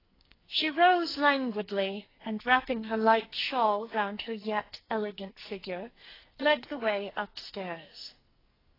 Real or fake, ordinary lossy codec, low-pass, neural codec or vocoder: fake; AAC, 24 kbps; 5.4 kHz; codec, 44.1 kHz, 2.6 kbps, SNAC